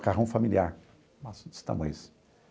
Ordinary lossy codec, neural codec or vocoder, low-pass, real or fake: none; none; none; real